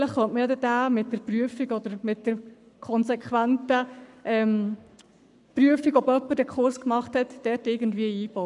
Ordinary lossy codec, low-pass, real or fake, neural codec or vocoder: none; 10.8 kHz; fake; codec, 44.1 kHz, 7.8 kbps, Pupu-Codec